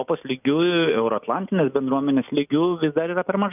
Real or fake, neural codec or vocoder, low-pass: real; none; 3.6 kHz